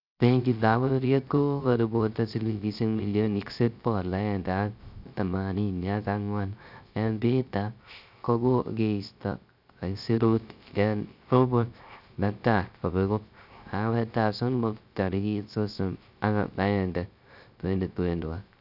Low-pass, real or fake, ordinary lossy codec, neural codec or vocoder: 5.4 kHz; fake; none; codec, 16 kHz, 0.3 kbps, FocalCodec